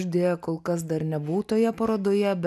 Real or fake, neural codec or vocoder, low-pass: real; none; 14.4 kHz